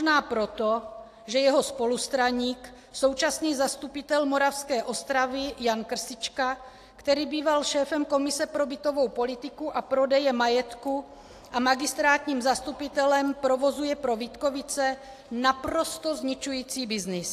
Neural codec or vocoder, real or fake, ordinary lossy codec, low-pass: none; real; AAC, 64 kbps; 14.4 kHz